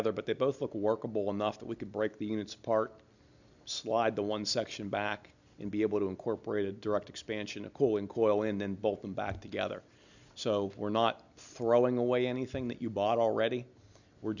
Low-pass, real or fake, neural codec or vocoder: 7.2 kHz; real; none